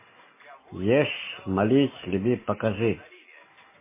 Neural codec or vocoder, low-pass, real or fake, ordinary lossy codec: none; 3.6 kHz; real; MP3, 16 kbps